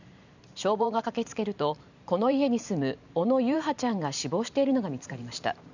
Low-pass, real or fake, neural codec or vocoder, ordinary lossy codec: 7.2 kHz; fake; vocoder, 44.1 kHz, 128 mel bands every 512 samples, BigVGAN v2; none